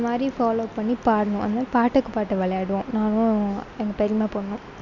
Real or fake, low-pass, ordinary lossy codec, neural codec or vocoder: real; 7.2 kHz; none; none